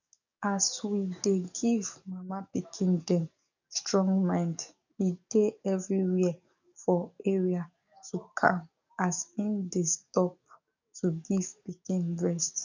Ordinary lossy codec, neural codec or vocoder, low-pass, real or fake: none; codec, 44.1 kHz, 7.8 kbps, DAC; 7.2 kHz; fake